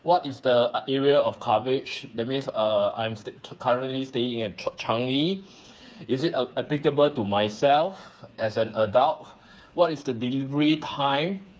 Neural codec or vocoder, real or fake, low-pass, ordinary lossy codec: codec, 16 kHz, 4 kbps, FreqCodec, smaller model; fake; none; none